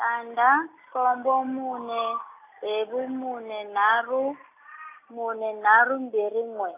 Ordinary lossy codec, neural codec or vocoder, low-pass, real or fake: AAC, 24 kbps; none; 3.6 kHz; real